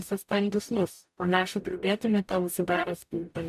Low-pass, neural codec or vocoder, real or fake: 14.4 kHz; codec, 44.1 kHz, 0.9 kbps, DAC; fake